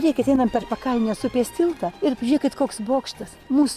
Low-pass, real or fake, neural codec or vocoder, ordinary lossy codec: 14.4 kHz; real; none; Opus, 64 kbps